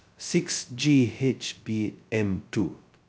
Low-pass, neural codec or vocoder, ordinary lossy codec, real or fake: none; codec, 16 kHz, 0.2 kbps, FocalCodec; none; fake